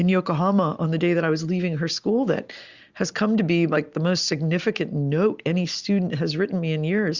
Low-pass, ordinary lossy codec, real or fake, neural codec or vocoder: 7.2 kHz; Opus, 64 kbps; real; none